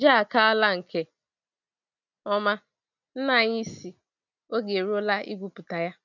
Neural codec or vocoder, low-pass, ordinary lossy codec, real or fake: none; 7.2 kHz; none; real